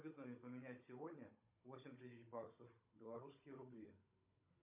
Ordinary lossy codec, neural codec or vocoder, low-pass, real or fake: MP3, 32 kbps; codec, 16 kHz, 16 kbps, FunCodec, trained on Chinese and English, 50 frames a second; 3.6 kHz; fake